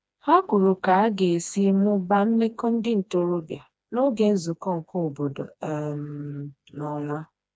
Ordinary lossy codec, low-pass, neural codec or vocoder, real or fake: none; none; codec, 16 kHz, 2 kbps, FreqCodec, smaller model; fake